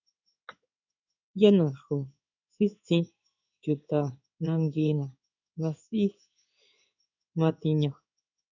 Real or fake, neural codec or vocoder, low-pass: fake; codec, 16 kHz in and 24 kHz out, 1 kbps, XY-Tokenizer; 7.2 kHz